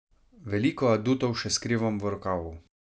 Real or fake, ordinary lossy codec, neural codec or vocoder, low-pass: real; none; none; none